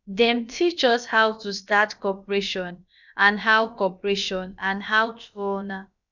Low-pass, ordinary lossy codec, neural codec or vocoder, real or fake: 7.2 kHz; none; codec, 16 kHz, about 1 kbps, DyCAST, with the encoder's durations; fake